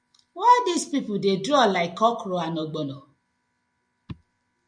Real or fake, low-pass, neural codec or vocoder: real; 9.9 kHz; none